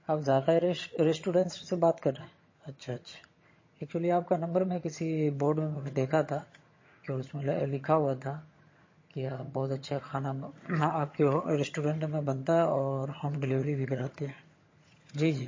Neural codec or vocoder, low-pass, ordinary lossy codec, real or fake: vocoder, 22.05 kHz, 80 mel bands, HiFi-GAN; 7.2 kHz; MP3, 32 kbps; fake